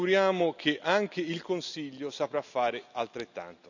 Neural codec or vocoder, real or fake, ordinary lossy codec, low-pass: none; real; none; 7.2 kHz